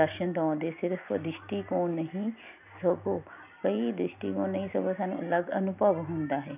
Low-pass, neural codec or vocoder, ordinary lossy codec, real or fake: 3.6 kHz; none; none; real